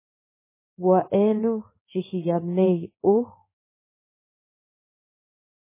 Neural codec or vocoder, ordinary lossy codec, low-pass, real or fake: codec, 16 kHz in and 24 kHz out, 1 kbps, XY-Tokenizer; MP3, 16 kbps; 3.6 kHz; fake